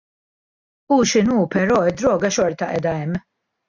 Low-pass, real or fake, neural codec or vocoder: 7.2 kHz; real; none